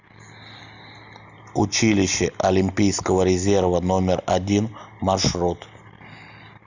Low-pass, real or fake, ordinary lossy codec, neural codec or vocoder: 7.2 kHz; real; Opus, 64 kbps; none